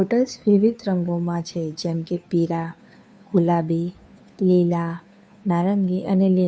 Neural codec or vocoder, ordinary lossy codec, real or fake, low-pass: codec, 16 kHz, 2 kbps, FunCodec, trained on Chinese and English, 25 frames a second; none; fake; none